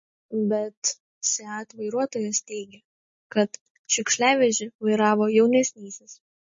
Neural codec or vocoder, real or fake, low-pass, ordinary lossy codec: none; real; 7.2 kHz; MP3, 32 kbps